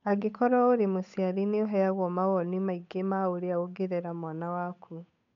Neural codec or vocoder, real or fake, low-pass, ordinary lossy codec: codec, 16 kHz, 8 kbps, FunCodec, trained on Chinese and English, 25 frames a second; fake; 7.2 kHz; none